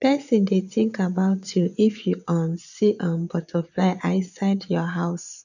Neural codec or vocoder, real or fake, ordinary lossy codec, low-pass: vocoder, 22.05 kHz, 80 mel bands, Vocos; fake; none; 7.2 kHz